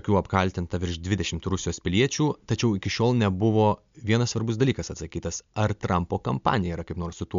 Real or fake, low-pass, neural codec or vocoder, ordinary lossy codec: real; 7.2 kHz; none; MP3, 64 kbps